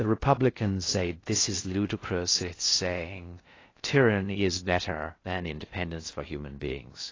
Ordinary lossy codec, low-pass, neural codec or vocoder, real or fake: AAC, 32 kbps; 7.2 kHz; codec, 16 kHz in and 24 kHz out, 0.6 kbps, FocalCodec, streaming, 2048 codes; fake